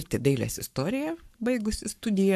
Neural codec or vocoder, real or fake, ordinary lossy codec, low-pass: codec, 44.1 kHz, 7.8 kbps, Pupu-Codec; fake; AAC, 96 kbps; 14.4 kHz